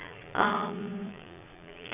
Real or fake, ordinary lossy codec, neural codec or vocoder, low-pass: fake; none; vocoder, 22.05 kHz, 80 mel bands, Vocos; 3.6 kHz